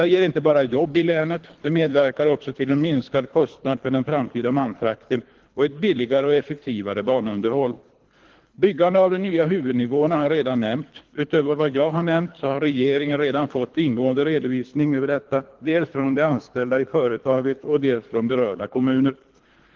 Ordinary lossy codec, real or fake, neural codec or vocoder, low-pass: Opus, 16 kbps; fake; codec, 24 kHz, 3 kbps, HILCodec; 7.2 kHz